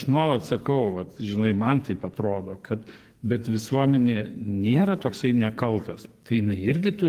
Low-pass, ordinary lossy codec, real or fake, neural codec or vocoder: 14.4 kHz; Opus, 24 kbps; fake; codec, 44.1 kHz, 2.6 kbps, SNAC